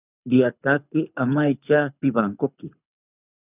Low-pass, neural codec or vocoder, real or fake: 3.6 kHz; codec, 24 kHz, 6 kbps, HILCodec; fake